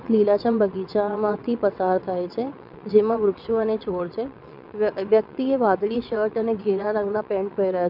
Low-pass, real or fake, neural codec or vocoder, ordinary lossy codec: 5.4 kHz; fake; vocoder, 22.05 kHz, 80 mel bands, WaveNeXt; none